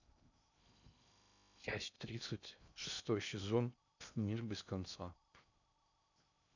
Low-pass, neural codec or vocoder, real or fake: 7.2 kHz; codec, 16 kHz in and 24 kHz out, 0.6 kbps, FocalCodec, streaming, 2048 codes; fake